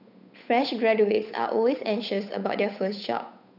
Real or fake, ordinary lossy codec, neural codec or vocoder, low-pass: fake; none; codec, 16 kHz, 8 kbps, FunCodec, trained on Chinese and English, 25 frames a second; 5.4 kHz